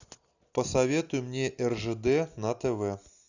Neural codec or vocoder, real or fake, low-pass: none; real; 7.2 kHz